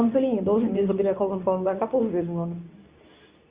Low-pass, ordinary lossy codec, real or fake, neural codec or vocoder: 3.6 kHz; Opus, 64 kbps; fake; codec, 24 kHz, 0.9 kbps, WavTokenizer, medium speech release version 2